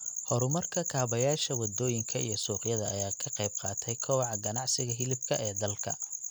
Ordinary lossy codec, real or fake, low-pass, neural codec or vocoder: none; real; none; none